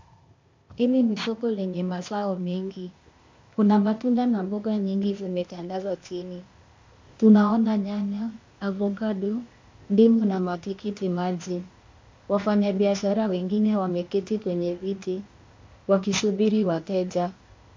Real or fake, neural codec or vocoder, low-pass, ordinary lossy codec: fake; codec, 16 kHz, 0.8 kbps, ZipCodec; 7.2 kHz; MP3, 48 kbps